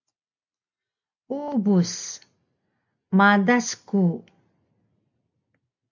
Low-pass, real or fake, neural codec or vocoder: 7.2 kHz; real; none